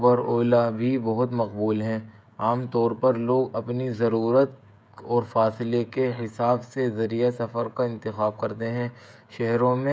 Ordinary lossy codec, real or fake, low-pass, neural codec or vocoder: none; fake; none; codec, 16 kHz, 16 kbps, FreqCodec, smaller model